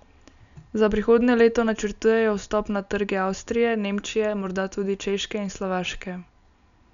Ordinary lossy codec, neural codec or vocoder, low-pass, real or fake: none; none; 7.2 kHz; real